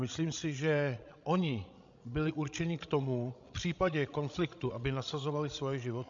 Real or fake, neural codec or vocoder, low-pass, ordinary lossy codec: fake; codec, 16 kHz, 16 kbps, FreqCodec, larger model; 7.2 kHz; MP3, 64 kbps